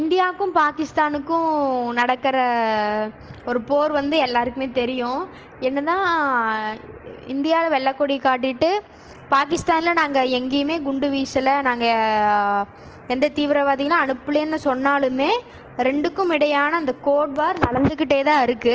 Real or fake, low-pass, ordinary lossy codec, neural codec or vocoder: real; 7.2 kHz; Opus, 16 kbps; none